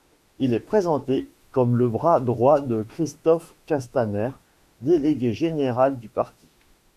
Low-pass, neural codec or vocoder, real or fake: 14.4 kHz; autoencoder, 48 kHz, 32 numbers a frame, DAC-VAE, trained on Japanese speech; fake